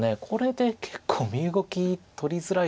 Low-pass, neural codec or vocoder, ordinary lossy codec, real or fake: none; none; none; real